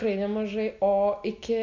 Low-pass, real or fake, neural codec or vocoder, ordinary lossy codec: 7.2 kHz; real; none; MP3, 64 kbps